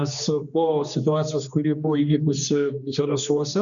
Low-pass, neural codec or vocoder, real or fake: 7.2 kHz; codec, 16 kHz, 2 kbps, X-Codec, HuBERT features, trained on general audio; fake